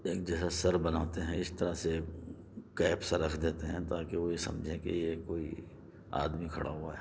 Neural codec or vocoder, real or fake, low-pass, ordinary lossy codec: none; real; none; none